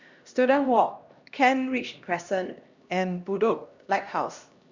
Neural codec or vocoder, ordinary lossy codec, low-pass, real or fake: codec, 16 kHz, 1 kbps, X-Codec, HuBERT features, trained on LibriSpeech; Opus, 64 kbps; 7.2 kHz; fake